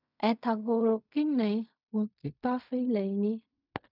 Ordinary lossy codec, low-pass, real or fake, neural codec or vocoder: none; 5.4 kHz; fake; codec, 16 kHz in and 24 kHz out, 0.4 kbps, LongCat-Audio-Codec, fine tuned four codebook decoder